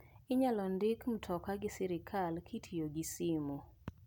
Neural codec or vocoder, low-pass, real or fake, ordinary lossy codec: none; none; real; none